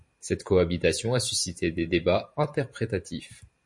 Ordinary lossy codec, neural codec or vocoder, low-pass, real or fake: MP3, 48 kbps; vocoder, 44.1 kHz, 128 mel bands every 512 samples, BigVGAN v2; 10.8 kHz; fake